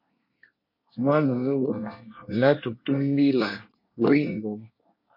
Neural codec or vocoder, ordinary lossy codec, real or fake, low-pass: codec, 24 kHz, 1 kbps, SNAC; MP3, 32 kbps; fake; 5.4 kHz